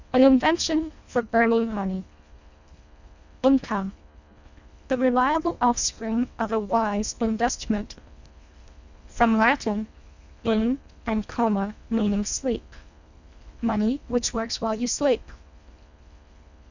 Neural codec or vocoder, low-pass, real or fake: codec, 24 kHz, 1.5 kbps, HILCodec; 7.2 kHz; fake